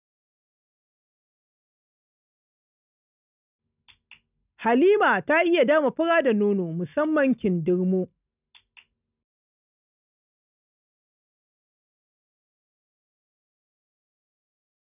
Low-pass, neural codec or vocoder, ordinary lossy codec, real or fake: 3.6 kHz; none; none; real